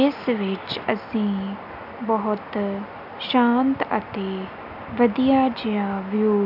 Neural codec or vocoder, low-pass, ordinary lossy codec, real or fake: none; 5.4 kHz; none; real